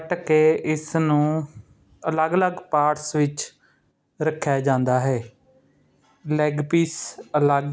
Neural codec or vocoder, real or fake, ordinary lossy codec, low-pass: none; real; none; none